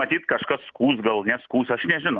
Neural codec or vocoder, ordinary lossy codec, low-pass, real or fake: none; Opus, 32 kbps; 7.2 kHz; real